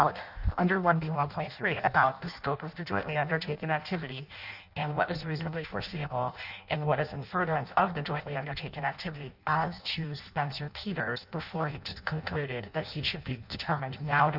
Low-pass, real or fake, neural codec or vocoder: 5.4 kHz; fake; codec, 16 kHz in and 24 kHz out, 0.6 kbps, FireRedTTS-2 codec